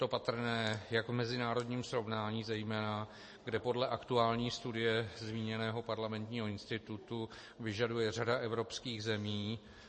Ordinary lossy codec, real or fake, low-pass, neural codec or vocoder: MP3, 32 kbps; real; 10.8 kHz; none